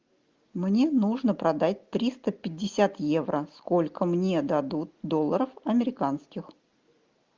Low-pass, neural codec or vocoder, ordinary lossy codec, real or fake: 7.2 kHz; none; Opus, 32 kbps; real